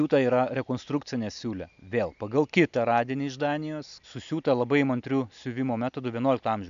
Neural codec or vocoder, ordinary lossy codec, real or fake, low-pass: none; MP3, 64 kbps; real; 7.2 kHz